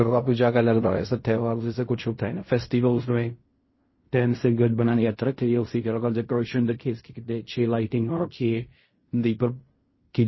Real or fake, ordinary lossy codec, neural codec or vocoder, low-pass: fake; MP3, 24 kbps; codec, 16 kHz in and 24 kHz out, 0.4 kbps, LongCat-Audio-Codec, fine tuned four codebook decoder; 7.2 kHz